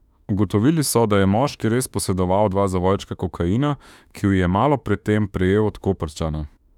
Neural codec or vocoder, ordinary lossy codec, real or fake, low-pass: autoencoder, 48 kHz, 32 numbers a frame, DAC-VAE, trained on Japanese speech; none; fake; 19.8 kHz